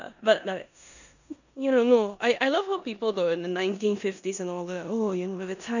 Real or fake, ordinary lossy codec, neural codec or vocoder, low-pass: fake; none; codec, 16 kHz in and 24 kHz out, 0.9 kbps, LongCat-Audio-Codec, four codebook decoder; 7.2 kHz